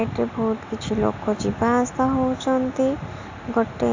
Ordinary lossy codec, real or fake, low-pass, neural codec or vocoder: AAC, 48 kbps; real; 7.2 kHz; none